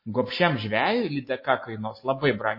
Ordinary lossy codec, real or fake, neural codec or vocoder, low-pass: MP3, 24 kbps; real; none; 5.4 kHz